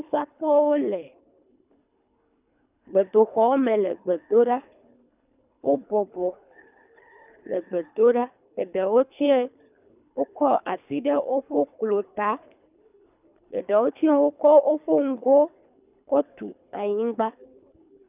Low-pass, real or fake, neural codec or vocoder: 3.6 kHz; fake; codec, 24 kHz, 3 kbps, HILCodec